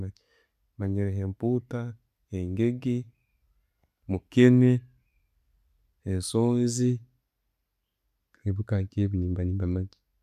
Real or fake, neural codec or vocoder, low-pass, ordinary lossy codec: real; none; 14.4 kHz; none